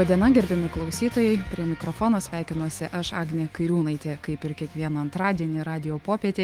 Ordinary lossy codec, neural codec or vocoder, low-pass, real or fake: Opus, 24 kbps; autoencoder, 48 kHz, 128 numbers a frame, DAC-VAE, trained on Japanese speech; 19.8 kHz; fake